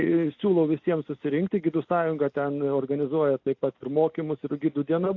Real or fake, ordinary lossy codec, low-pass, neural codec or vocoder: real; AAC, 48 kbps; 7.2 kHz; none